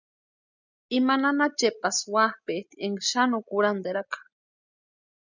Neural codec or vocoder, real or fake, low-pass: none; real; 7.2 kHz